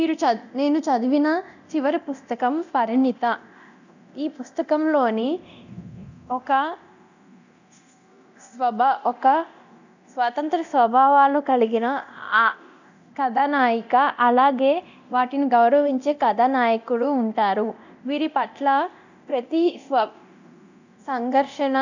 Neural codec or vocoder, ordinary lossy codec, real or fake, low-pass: codec, 24 kHz, 0.9 kbps, DualCodec; none; fake; 7.2 kHz